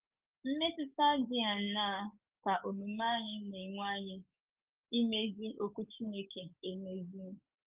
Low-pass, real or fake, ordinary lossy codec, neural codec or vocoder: 3.6 kHz; fake; Opus, 24 kbps; codec, 44.1 kHz, 7.8 kbps, DAC